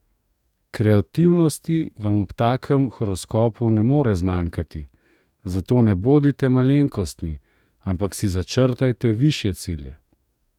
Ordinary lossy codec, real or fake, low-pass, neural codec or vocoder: none; fake; 19.8 kHz; codec, 44.1 kHz, 2.6 kbps, DAC